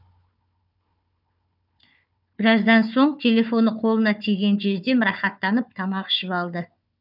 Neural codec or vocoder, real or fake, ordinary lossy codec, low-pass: codec, 16 kHz, 4 kbps, FunCodec, trained on Chinese and English, 50 frames a second; fake; none; 5.4 kHz